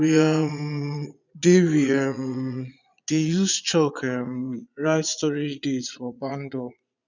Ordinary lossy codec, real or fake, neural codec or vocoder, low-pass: none; fake; vocoder, 22.05 kHz, 80 mel bands, Vocos; 7.2 kHz